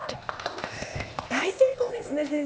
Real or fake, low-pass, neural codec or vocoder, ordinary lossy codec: fake; none; codec, 16 kHz, 0.8 kbps, ZipCodec; none